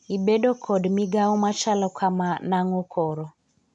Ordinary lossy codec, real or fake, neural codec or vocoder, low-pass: none; real; none; 10.8 kHz